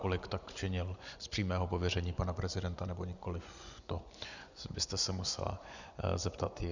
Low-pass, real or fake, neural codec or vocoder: 7.2 kHz; fake; vocoder, 24 kHz, 100 mel bands, Vocos